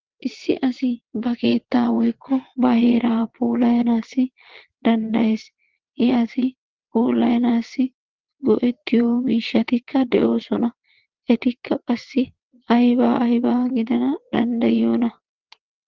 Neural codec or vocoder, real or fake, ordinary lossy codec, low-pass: vocoder, 22.05 kHz, 80 mel bands, WaveNeXt; fake; Opus, 16 kbps; 7.2 kHz